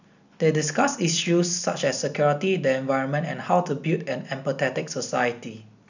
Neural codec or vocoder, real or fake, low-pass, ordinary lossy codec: none; real; 7.2 kHz; none